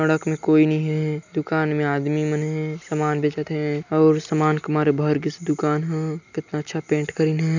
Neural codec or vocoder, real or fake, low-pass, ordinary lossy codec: none; real; 7.2 kHz; none